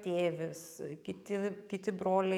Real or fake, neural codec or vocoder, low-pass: fake; codec, 44.1 kHz, 7.8 kbps, DAC; 19.8 kHz